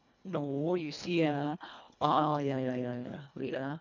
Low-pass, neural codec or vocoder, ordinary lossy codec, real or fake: 7.2 kHz; codec, 24 kHz, 1.5 kbps, HILCodec; none; fake